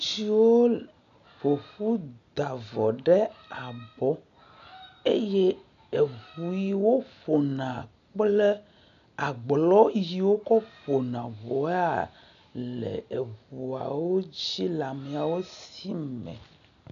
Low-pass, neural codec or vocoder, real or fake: 7.2 kHz; none; real